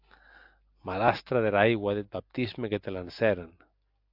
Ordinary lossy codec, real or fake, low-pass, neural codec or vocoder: AAC, 48 kbps; real; 5.4 kHz; none